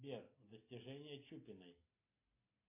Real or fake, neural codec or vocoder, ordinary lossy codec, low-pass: real; none; MP3, 24 kbps; 3.6 kHz